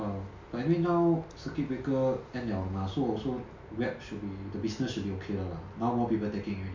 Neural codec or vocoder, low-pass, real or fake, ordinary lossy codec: none; 7.2 kHz; real; MP3, 64 kbps